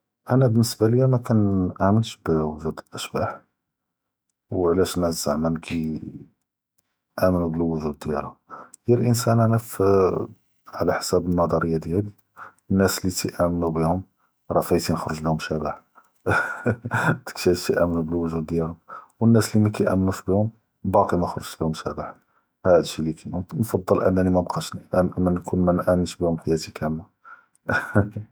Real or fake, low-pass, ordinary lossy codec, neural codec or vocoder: fake; none; none; autoencoder, 48 kHz, 128 numbers a frame, DAC-VAE, trained on Japanese speech